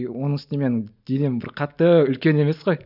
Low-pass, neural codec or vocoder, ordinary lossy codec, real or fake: 5.4 kHz; none; none; real